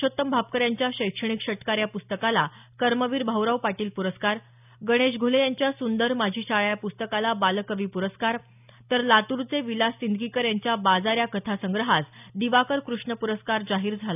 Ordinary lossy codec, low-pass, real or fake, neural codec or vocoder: none; 3.6 kHz; real; none